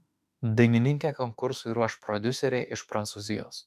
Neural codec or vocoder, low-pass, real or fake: autoencoder, 48 kHz, 32 numbers a frame, DAC-VAE, trained on Japanese speech; 14.4 kHz; fake